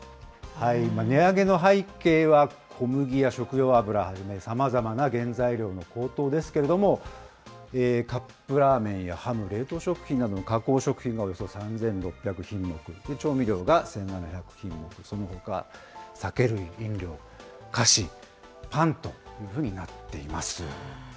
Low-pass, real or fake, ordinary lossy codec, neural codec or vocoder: none; real; none; none